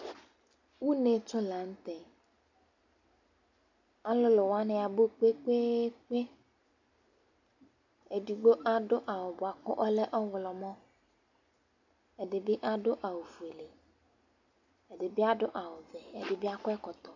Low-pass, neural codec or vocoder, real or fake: 7.2 kHz; none; real